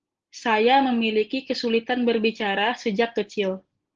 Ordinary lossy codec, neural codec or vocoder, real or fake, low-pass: Opus, 16 kbps; none; real; 7.2 kHz